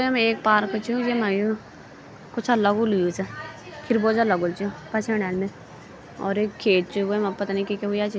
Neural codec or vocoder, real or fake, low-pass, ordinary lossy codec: none; real; none; none